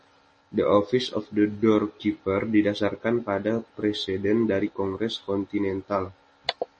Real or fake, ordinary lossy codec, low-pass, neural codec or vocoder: real; MP3, 32 kbps; 10.8 kHz; none